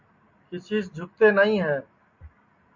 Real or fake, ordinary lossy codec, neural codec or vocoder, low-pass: real; MP3, 48 kbps; none; 7.2 kHz